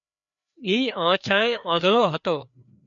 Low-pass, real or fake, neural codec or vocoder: 7.2 kHz; fake; codec, 16 kHz, 2 kbps, FreqCodec, larger model